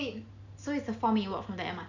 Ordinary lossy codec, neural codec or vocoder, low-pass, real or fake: none; none; 7.2 kHz; real